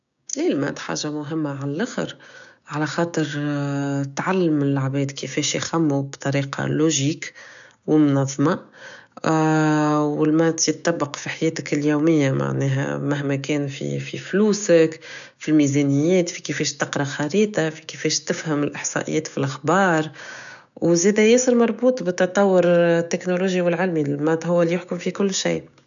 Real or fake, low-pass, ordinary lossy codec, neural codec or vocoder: fake; 7.2 kHz; none; codec, 16 kHz, 6 kbps, DAC